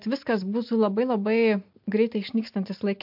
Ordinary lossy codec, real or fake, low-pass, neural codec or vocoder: MP3, 48 kbps; real; 5.4 kHz; none